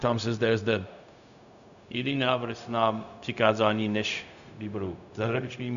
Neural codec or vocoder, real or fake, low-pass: codec, 16 kHz, 0.4 kbps, LongCat-Audio-Codec; fake; 7.2 kHz